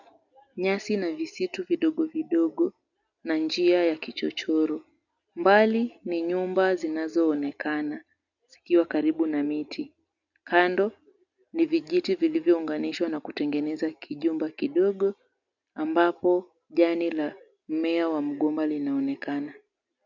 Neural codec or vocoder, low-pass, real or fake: none; 7.2 kHz; real